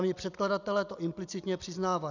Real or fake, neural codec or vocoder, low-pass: real; none; 7.2 kHz